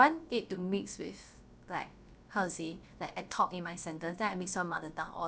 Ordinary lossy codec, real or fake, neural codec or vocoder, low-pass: none; fake; codec, 16 kHz, about 1 kbps, DyCAST, with the encoder's durations; none